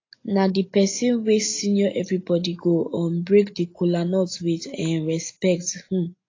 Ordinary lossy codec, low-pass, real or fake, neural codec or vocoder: AAC, 32 kbps; 7.2 kHz; real; none